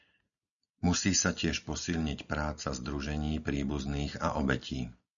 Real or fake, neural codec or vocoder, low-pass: real; none; 7.2 kHz